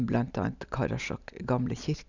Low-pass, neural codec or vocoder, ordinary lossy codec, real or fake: 7.2 kHz; none; none; real